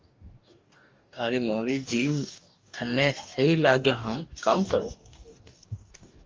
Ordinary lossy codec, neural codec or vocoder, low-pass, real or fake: Opus, 32 kbps; codec, 44.1 kHz, 2.6 kbps, DAC; 7.2 kHz; fake